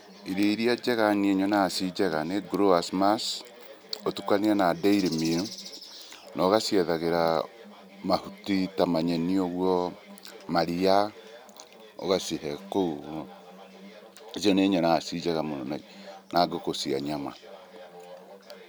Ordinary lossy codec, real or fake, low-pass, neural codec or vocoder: none; real; none; none